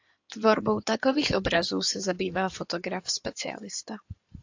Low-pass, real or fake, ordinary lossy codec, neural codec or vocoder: 7.2 kHz; fake; AAC, 48 kbps; codec, 16 kHz in and 24 kHz out, 2.2 kbps, FireRedTTS-2 codec